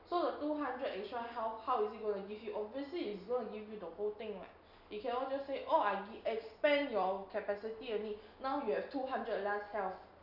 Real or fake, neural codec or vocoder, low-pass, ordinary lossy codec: real; none; 5.4 kHz; none